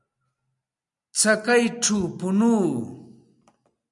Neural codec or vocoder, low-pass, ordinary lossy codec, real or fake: none; 10.8 kHz; MP3, 96 kbps; real